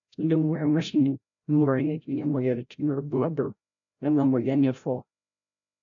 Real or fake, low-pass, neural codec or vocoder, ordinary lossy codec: fake; 7.2 kHz; codec, 16 kHz, 0.5 kbps, FreqCodec, larger model; AAC, 64 kbps